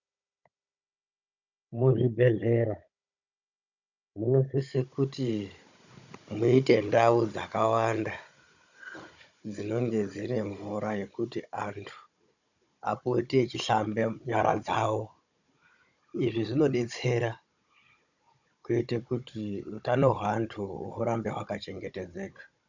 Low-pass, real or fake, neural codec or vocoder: 7.2 kHz; fake; codec, 16 kHz, 16 kbps, FunCodec, trained on Chinese and English, 50 frames a second